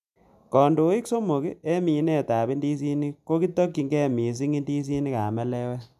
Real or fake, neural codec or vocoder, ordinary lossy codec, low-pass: real; none; none; 14.4 kHz